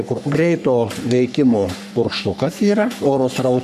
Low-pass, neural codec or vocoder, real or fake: 14.4 kHz; codec, 44.1 kHz, 3.4 kbps, Pupu-Codec; fake